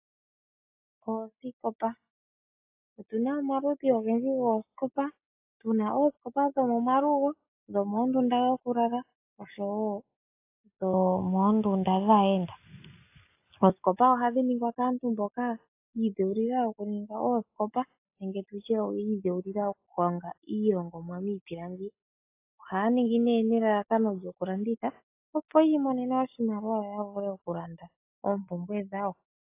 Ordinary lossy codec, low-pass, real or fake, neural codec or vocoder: AAC, 24 kbps; 3.6 kHz; real; none